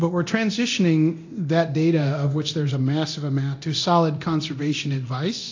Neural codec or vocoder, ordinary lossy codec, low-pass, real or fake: codec, 24 kHz, 0.9 kbps, DualCodec; AAC, 48 kbps; 7.2 kHz; fake